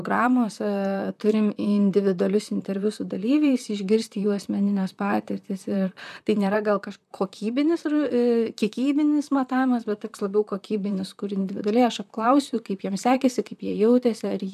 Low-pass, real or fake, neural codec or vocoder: 14.4 kHz; fake; vocoder, 44.1 kHz, 128 mel bands, Pupu-Vocoder